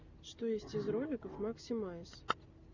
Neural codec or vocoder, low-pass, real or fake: none; 7.2 kHz; real